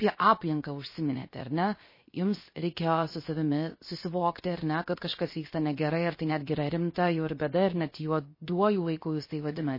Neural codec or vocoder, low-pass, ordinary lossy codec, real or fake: codec, 16 kHz, 0.7 kbps, FocalCodec; 5.4 kHz; MP3, 24 kbps; fake